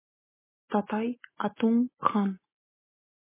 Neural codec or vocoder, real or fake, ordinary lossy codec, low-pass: none; real; MP3, 16 kbps; 3.6 kHz